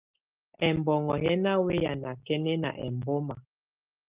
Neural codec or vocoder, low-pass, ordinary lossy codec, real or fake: none; 3.6 kHz; Opus, 32 kbps; real